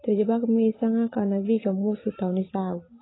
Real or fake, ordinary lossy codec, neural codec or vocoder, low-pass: real; AAC, 16 kbps; none; 7.2 kHz